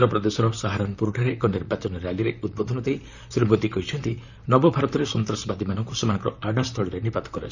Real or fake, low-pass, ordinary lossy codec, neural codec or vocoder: fake; 7.2 kHz; none; vocoder, 44.1 kHz, 128 mel bands, Pupu-Vocoder